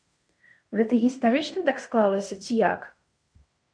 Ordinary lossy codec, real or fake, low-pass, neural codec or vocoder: MP3, 64 kbps; fake; 9.9 kHz; codec, 16 kHz in and 24 kHz out, 0.9 kbps, LongCat-Audio-Codec, fine tuned four codebook decoder